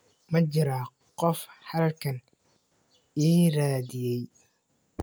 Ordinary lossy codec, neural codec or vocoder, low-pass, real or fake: none; none; none; real